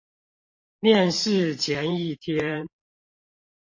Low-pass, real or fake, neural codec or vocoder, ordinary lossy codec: 7.2 kHz; fake; vocoder, 44.1 kHz, 128 mel bands every 512 samples, BigVGAN v2; MP3, 32 kbps